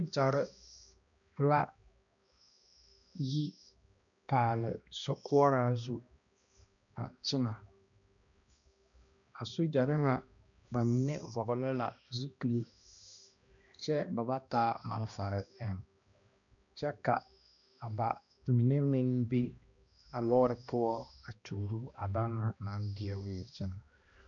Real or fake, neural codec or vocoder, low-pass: fake; codec, 16 kHz, 1 kbps, X-Codec, HuBERT features, trained on balanced general audio; 7.2 kHz